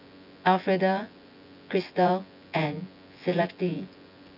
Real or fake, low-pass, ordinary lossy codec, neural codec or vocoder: fake; 5.4 kHz; none; vocoder, 24 kHz, 100 mel bands, Vocos